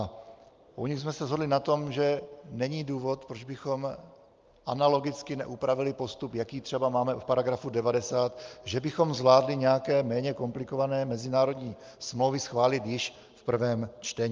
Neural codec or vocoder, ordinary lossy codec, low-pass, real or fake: none; Opus, 24 kbps; 7.2 kHz; real